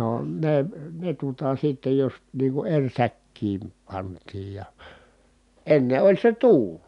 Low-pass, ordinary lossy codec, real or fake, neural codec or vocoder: 10.8 kHz; none; real; none